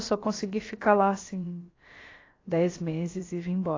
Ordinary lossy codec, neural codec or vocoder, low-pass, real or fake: AAC, 32 kbps; codec, 16 kHz, about 1 kbps, DyCAST, with the encoder's durations; 7.2 kHz; fake